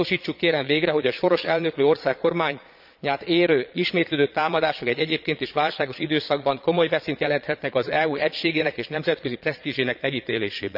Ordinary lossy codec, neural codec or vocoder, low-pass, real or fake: none; vocoder, 22.05 kHz, 80 mel bands, Vocos; 5.4 kHz; fake